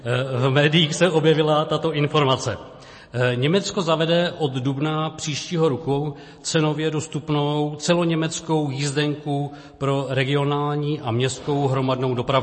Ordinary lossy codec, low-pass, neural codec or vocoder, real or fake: MP3, 32 kbps; 9.9 kHz; none; real